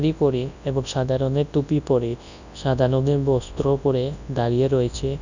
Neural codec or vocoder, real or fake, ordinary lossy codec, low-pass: codec, 24 kHz, 0.9 kbps, WavTokenizer, large speech release; fake; none; 7.2 kHz